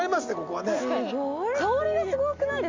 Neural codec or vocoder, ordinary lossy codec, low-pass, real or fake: none; MP3, 64 kbps; 7.2 kHz; real